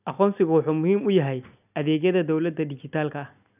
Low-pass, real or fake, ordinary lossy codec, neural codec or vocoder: 3.6 kHz; real; none; none